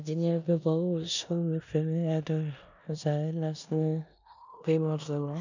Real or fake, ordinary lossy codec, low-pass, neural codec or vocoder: fake; none; 7.2 kHz; codec, 16 kHz in and 24 kHz out, 0.9 kbps, LongCat-Audio-Codec, four codebook decoder